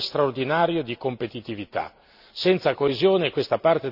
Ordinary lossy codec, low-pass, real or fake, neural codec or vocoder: none; 5.4 kHz; real; none